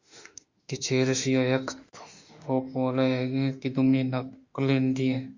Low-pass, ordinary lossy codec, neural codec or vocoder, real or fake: 7.2 kHz; Opus, 64 kbps; autoencoder, 48 kHz, 32 numbers a frame, DAC-VAE, trained on Japanese speech; fake